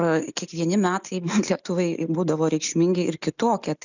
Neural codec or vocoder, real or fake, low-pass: none; real; 7.2 kHz